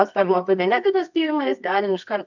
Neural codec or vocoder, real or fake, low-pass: codec, 24 kHz, 0.9 kbps, WavTokenizer, medium music audio release; fake; 7.2 kHz